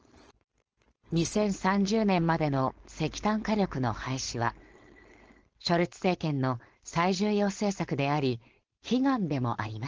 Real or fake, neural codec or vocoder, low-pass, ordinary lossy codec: fake; codec, 16 kHz, 4.8 kbps, FACodec; 7.2 kHz; Opus, 16 kbps